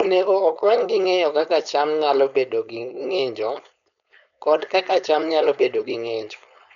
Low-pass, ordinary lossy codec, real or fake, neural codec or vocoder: 7.2 kHz; none; fake; codec, 16 kHz, 4.8 kbps, FACodec